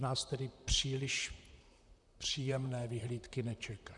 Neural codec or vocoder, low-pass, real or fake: vocoder, 44.1 kHz, 128 mel bands, Pupu-Vocoder; 10.8 kHz; fake